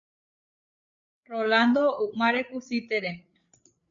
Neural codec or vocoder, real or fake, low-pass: codec, 16 kHz, 8 kbps, FreqCodec, larger model; fake; 7.2 kHz